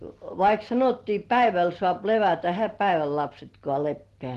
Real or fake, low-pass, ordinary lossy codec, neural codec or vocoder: real; 14.4 kHz; Opus, 16 kbps; none